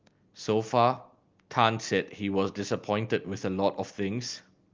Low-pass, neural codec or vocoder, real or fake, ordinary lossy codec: 7.2 kHz; none; real; Opus, 32 kbps